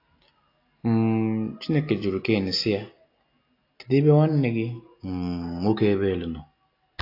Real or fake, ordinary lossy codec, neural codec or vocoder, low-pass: real; AAC, 32 kbps; none; 5.4 kHz